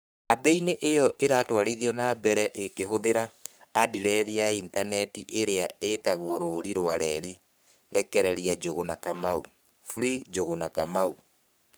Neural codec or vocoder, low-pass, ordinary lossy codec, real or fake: codec, 44.1 kHz, 3.4 kbps, Pupu-Codec; none; none; fake